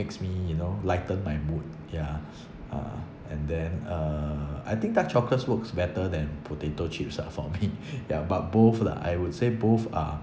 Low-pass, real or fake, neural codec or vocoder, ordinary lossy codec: none; real; none; none